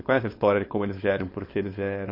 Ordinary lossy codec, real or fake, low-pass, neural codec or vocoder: MP3, 32 kbps; fake; 5.4 kHz; codec, 16 kHz, 4.8 kbps, FACodec